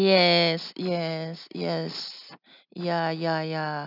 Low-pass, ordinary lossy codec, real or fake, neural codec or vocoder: 5.4 kHz; none; real; none